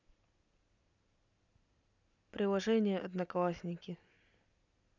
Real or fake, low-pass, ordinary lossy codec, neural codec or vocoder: real; 7.2 kHz; none; none